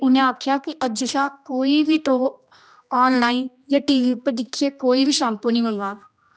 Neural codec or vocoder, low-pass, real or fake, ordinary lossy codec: codec, 16 kHz, 1 kbps, X-Codec, HuBERT features, trained on general audio; none; fake; none